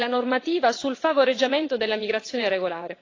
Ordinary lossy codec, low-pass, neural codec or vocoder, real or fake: AAC, 32 kbps; 7.2 kHz; vocoder, 22.05 kHz, 80 mel bands, WaveNeXt; fake